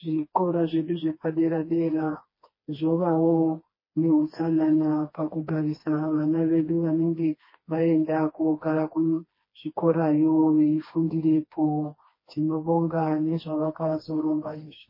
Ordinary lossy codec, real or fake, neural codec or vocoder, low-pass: MP3, 24 kbps; fake; codec, 16 kHz, 2 kbps, FreqCodec, smaller model; 5.4 kHz